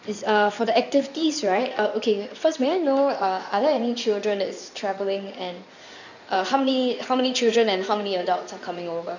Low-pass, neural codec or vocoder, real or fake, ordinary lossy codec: 7.2 kHz; codec, 16 kHz in and 24 kHz out, 2.2 kbps, FireRedTTS-2 codec; fake; none